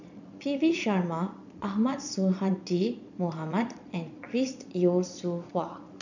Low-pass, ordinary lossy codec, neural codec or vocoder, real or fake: 7.2 kHz; none; vocoder, 22.05 kHz, 80 mel bands, Vocos; fake